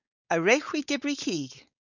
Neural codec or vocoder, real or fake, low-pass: codec, 16 kHz, 4.8 kbps, FACodec; fake; 7.2 kHz